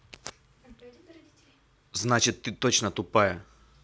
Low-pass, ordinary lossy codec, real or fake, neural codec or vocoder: none; none; real; none